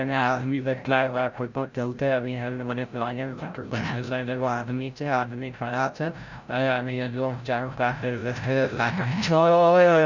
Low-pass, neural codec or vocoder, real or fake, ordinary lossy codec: 7.2 kHz; codec, 16 kHz, 0.5 kbps, FreqCodec, larger model; fake; none